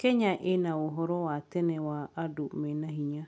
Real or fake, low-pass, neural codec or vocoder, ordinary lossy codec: real; none; none; none